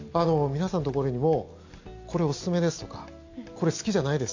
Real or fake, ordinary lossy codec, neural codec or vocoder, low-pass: real; AAC, 48 kbps; none; 7.2 kHz